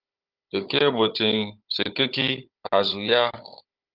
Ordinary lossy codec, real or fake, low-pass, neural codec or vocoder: Opus, 24 kbps; fake; 5.4 kHz; codec, 16 kHz, 16 kbps, FunCodec, trained on Chinese and English, 50 frames a second